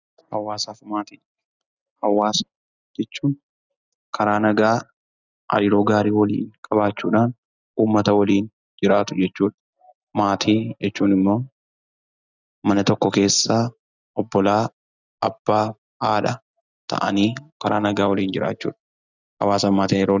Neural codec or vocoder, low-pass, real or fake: none; 7.2 kHz; real